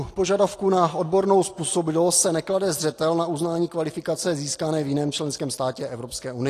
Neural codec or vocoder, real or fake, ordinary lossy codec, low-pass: none; real; AAC, 48 kbps; 14.4 kHz